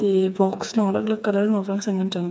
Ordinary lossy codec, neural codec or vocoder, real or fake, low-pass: none; codec, 16 kHz, 4 kbps, FreqCodec, smaller model; fake; none